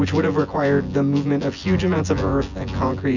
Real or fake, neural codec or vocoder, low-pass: fake; vocoder, 24 kHz, 100 mel bands, Vocos; 7.2 kHz